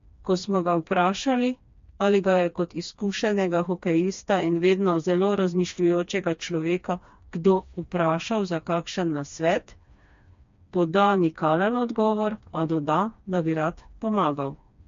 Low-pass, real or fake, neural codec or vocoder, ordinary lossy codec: 7.2 kHz; fake; codec, 16 kHz, 2 kbps, FreqCodec, smaller model; MP3, 48 kbps